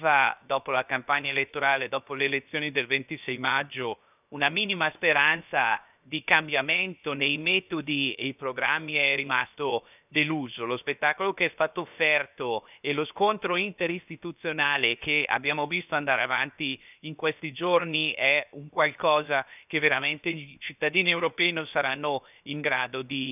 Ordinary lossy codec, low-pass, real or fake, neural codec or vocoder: none; 3.6 kHz; fake; codec, 16 kHz, 0.7 kbps, FocalCodec